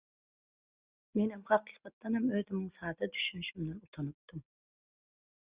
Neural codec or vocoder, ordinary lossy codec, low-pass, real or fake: none; Opus, 64 kbps; 3.6 kHz; real